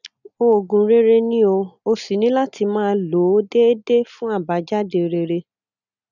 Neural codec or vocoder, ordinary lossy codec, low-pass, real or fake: none; none; 7.2 kHz; real